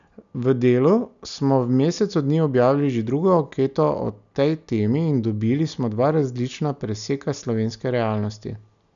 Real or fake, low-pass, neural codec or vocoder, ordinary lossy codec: real; 7.2 kHz; none; none